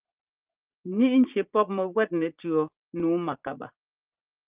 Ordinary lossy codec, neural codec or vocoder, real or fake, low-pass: Opus, 32 kbps; vocoder, 44.1 kHz, 80 mel bands, Vocos; fake; 3.6 kHz